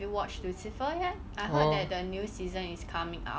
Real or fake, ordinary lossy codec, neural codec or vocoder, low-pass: real; none; none; none